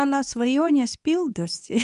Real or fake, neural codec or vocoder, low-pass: fake; codec, 24 kHz, 0.9 kbps, WavTokenizer, medium speech release version 2; 10.8 kHz